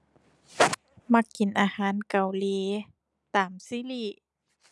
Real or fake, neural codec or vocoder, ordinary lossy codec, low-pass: real; none; none; none